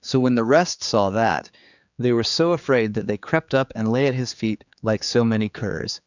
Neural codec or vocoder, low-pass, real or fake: codec, 16 kHz, 4 kbps, X-Codec, HuBERT features, trained on general audio; 7.2 kHz; fake